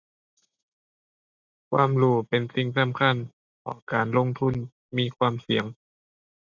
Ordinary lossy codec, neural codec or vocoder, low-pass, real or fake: none; none; 7.2 kHz; real